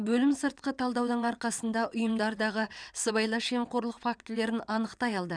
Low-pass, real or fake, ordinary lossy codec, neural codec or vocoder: 9.9 kHz; fake; none; vocoder, 22.05 kHz, 80 mel bands, WaveNeXt